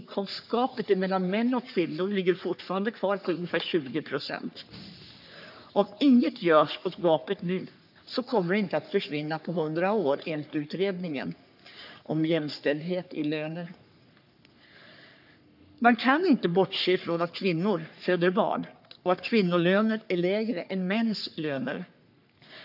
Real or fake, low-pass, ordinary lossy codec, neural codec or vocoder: fake; 5.4 kHz; AAC, 48 kbps; codec, 44.1 kHz, 3.4 kbps, Pupu-Codec